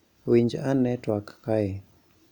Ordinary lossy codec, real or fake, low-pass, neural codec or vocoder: none; real; 19.8 kHz; none